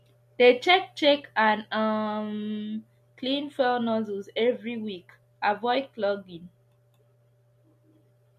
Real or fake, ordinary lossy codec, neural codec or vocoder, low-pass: real; MP3, 64 kbps; none; 14.4 kHz